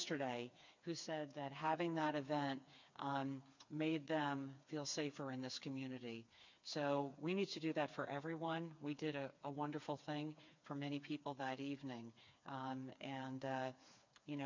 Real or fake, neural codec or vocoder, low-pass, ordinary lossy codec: fake; codec, 16 kHz, 4 kbps, FreqCodec, smaller model; 7.2 kHz; MP3, 48 kbps